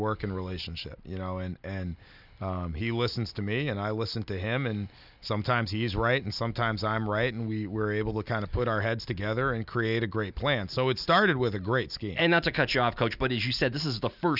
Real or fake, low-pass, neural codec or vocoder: real; 5.4 kHz; none